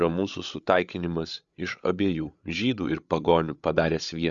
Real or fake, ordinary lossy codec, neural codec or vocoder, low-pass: fake; Opus, 64 kbps; codec, 16 kHz, 8 kbps, FreqCodec, larger model; 7.2 kHz